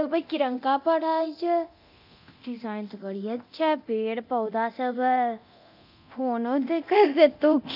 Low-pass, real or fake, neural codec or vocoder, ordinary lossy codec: 5.4 kHz; fake; codec, 24 kHz, 0.9 kbps, DualCodec; none